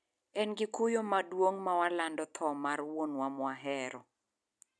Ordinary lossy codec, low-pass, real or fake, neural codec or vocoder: none; none; real; none